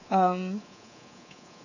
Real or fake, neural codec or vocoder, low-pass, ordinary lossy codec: fake; codec, 24 kHz, 3.1 kbps, DualCodec; 7.2 kHz; none